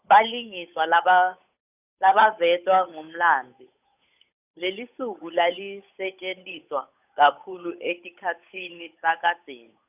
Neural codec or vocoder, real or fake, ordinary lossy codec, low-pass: codec, 16 kHz, 8 kbps, FunCodec, trained on Chinese and English, 25 frames a second; fake; none; 3.6 kHz